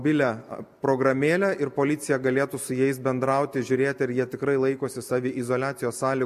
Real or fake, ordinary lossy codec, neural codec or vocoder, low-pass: real; MP3, 64 kbps; none; 14.4 kHz